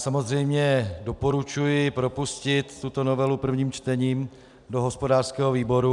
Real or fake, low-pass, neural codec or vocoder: real; 10.8 kHz; none